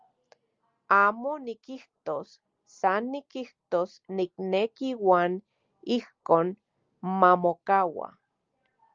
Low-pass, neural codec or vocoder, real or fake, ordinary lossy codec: 7.2 kHz; none; real; Opus, 32 kbps